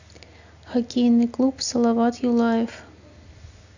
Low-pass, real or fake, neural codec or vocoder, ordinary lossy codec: 7.2 kHz; real; none; none